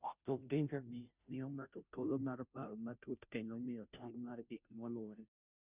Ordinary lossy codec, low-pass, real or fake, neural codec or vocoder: none; 3.6 kHz; fake; codec, 16 kHz, 0.5 kbps, FunCodec, trained on Chinese and English, 25 frames a second